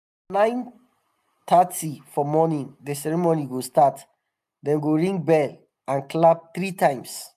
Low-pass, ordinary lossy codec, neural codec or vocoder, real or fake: 14.4 kHz; AAC, 96 kbps; none; real